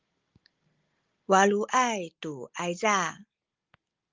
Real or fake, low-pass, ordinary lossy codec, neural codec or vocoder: real; 7.2 kHz; Opus, 24 kbps; none